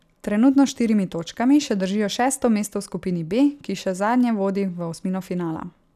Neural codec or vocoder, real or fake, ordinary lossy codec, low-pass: none; real; none; 14.4 kHz